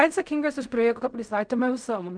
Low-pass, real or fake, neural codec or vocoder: 9.9 kHz; fake; codec, 16 kHz in and 24 kHz out, 0.4 kbps, LongCat-Audio-Codec, fine tuned four codebook decoder